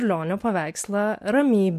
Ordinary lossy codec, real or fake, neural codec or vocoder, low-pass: MP3, 64 kbps; real; none; 14.4 kHz